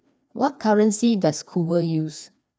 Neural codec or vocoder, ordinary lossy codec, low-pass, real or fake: codec, 16 kHz, 2 kbps, FreqCodec, larger model; none; none; fake